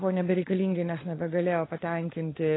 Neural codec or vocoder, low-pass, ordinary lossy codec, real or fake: codec, 16 kHz, 16 kbps, FunCodec, trained on LibriTTS, 50 frames a second; 7.2 kHz; AAC, 16 kbps; fake